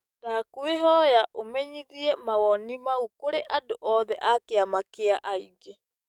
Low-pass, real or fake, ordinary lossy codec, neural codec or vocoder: 19.8 kHz; fake; none; codec, 44.1 kHz, 7.8 kbps, DAC